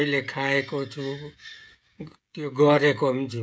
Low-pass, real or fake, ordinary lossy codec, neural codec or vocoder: none; fake; none; codec, 16 kHz, 16 kbps, FreqCodec, smaller model